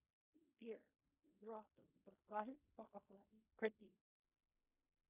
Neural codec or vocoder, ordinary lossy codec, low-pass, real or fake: codec, 16 kHz in and 24 kHz out, 0.4 kbps, LongCat-Audio-Codec, fine tuned four codebook decoder; Opus, 64 kbps; 3.6 kHz; fake